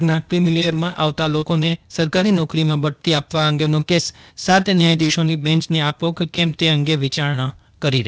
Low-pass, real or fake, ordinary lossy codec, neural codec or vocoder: none; fake; none; codec, 16 kHz, 0.8 kbps, ZipCodec